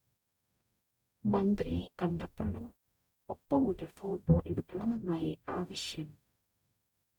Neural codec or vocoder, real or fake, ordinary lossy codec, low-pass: codec, 44.1 kHz, 0.9 kbps, DAC; fake; none; 19.8 kHz